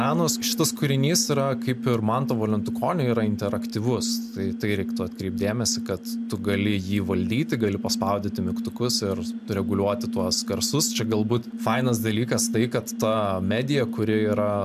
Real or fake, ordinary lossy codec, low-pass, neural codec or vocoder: real; MP3, 96 kbps; 14.4 kHz; none